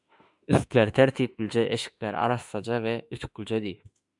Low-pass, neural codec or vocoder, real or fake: 10.8 kHz; autoencoder, 48 kHz, 32 numbers a frame, DAC-VAE, trained on Japanese speech; fake